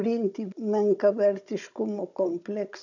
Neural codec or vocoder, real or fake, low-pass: codec, 16 kHz, 16 kbps, FunCodec, trained on Chinese and English, 50 frames a second; fake; 7.2 kHz